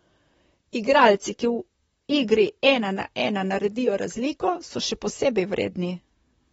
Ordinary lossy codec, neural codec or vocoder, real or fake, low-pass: AAC, 24 kbps; vocoder, 44.1 kHz, 128 mel bands, Pupu-Vocoder; fake; 19.8 kHz